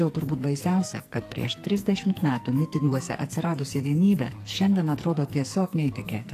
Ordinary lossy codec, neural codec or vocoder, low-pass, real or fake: AAC, 64 kbps; codec, 44.1 kHz, 2.6 kbps, SNAC; 14.4 kHz; fake